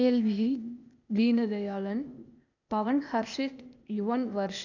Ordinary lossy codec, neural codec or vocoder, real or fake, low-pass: none; codec, 16 kHz, 0.8 kbps, ZipCodec; fake; 7.2 kHz